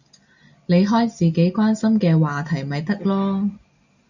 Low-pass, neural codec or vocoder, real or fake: 7.2 kHz; none; real